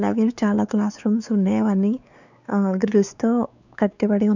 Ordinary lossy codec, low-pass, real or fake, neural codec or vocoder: none; 7.2 kHz; fake; codec, 16 kHz, 4 kbps, X-Codec, WavLM features, trained on Multilingual LibriSpeech